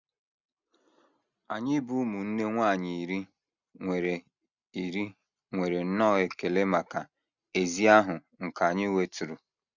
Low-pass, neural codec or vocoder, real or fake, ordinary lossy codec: 7.2 kHz; none; real; none